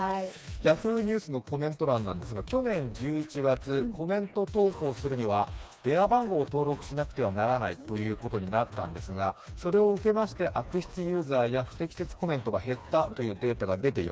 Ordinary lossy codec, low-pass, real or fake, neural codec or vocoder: none; none; fake; codec, 16 kHz, 2 kbps, FreqCodec, smaller model